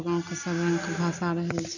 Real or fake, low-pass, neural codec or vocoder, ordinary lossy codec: real; 7.2 kHz; none; none